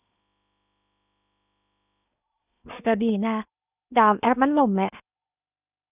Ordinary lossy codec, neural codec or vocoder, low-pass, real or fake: none; codec, 16 kHz in and 24 kHz out, 0.8 kbps, FocalCodec, streaming, 65536 codes; 3.6 kHz; fake